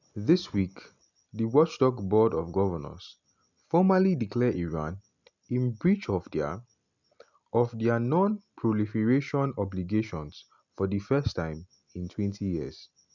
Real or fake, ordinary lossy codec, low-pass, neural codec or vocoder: real; none; 7.2 kHz; none